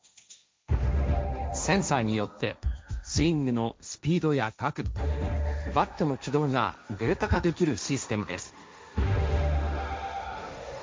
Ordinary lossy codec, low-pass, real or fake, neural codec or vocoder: none; none; fake; codec, 16 kHz, 1.1 kbps, Voila-Tokenizer